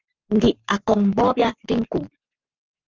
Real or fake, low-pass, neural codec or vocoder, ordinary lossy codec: fake; 7.2 kHz; codec, 44.1 kHz, 7.8 kbps, Pupu-Codec; Opus, 24 kbps